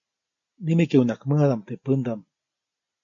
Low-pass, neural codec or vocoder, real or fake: 7.2 kHz; none; real